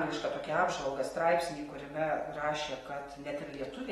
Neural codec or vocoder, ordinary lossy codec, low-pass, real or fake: none; AAC, 32 kbps; 19.8 kHz; real